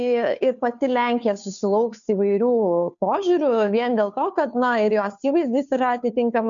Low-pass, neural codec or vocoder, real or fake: 7.2 kHz; codec, 16 kHz, 2 kbps, FunCodec, trained on Chinese and English, 25 frames a second; fake